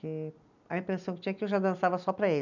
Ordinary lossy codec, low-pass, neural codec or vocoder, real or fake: none; 7.2 kHz; none; real